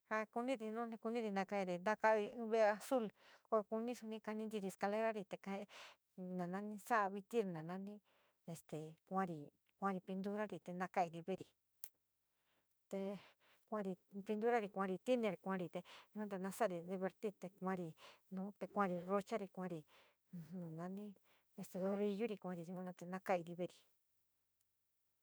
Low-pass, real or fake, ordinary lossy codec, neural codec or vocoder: none; fake; none; autoencoder, 48 kHz, 32 numbers a frame, DAC-VAE, trained on Japanese speech